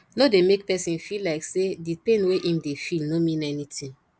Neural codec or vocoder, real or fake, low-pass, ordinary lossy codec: none; real; none; none